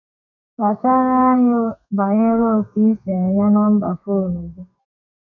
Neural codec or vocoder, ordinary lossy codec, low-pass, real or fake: codec, 32 kHz, 1.9 kbps, SNAC; none; 7.2 kHz; fake